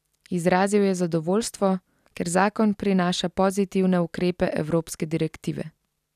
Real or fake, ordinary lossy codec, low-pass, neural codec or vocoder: real; none; 14.4 kHz; none